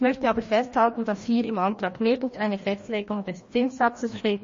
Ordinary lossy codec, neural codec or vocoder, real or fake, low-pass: MP3, 32 kbps; codec, 16 kHz, 1 kbps, FreqCodec, larger model; fake; 7.2 kHz